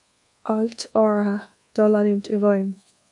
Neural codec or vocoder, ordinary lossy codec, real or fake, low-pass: codec, 24 kHz, 1.2 kbps, DualCodec; MP3, 96 kbps; fake; 10.8 kHz